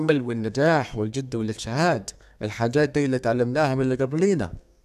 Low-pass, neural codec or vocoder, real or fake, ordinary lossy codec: 14.4 kHz; codec, 32 kHz, 1.9 kbps, SNAC; fake; none